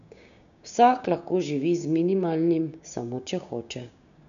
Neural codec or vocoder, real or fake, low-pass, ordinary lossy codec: codec, 16 kHz, 6 kbps, DAC; fake; 7.2 kHz; none